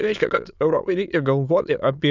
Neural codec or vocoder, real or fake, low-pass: autoencoder, 22.05 kHz, a latent of 192 numbers a frame, VITS, trained on many speakers; fake; 7.2 kHz